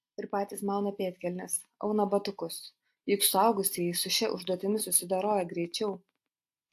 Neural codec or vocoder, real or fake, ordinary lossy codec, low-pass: none; real; AAC, 64 kbps; 14.4 kHz